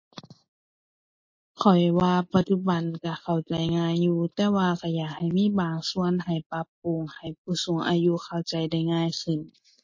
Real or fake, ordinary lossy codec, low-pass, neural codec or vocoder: real; MP3, 32 kbps; 7.2 kHz; none